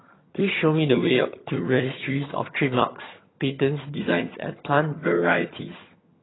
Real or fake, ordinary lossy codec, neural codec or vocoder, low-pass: fake; AAC, 16 kbps; vocoder, 22.05 kHz, 80 mel bands, HiFi-GAN; 7.2 kHz